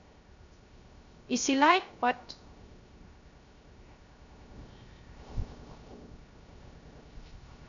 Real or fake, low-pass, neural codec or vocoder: fake; 7.2 kHz; codec, 16 kHz, 0.3 kbps, FocalCodec